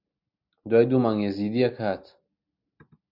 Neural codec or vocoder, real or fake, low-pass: none; real; 5.4 kHz